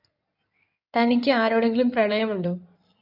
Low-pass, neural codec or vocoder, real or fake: 5.4 kHz; codec, 16 kHz in and 24 kHz out, 2.2 kbps, FireRedTTS-2 codec; fake